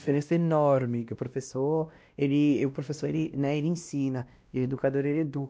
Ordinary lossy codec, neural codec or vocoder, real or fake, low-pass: none; codec, 16 kHz, 1 kbps, X-Codec, WavLM features, trained on Multilingual LibriSpeech; fake; none